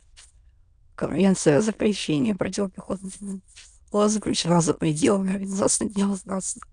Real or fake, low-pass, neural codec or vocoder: fake; 9.9 kHz; autoencoder, 22.05 kHz, a latent of 192 numbers a frame, VITS, trained on many speakers